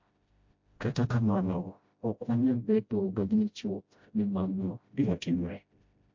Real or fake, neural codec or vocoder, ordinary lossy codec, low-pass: fake; codec, 16 kHz, 0.5 kbps, FreqCodec, smaller model; MP3, 48 kbps; 7.2 kHz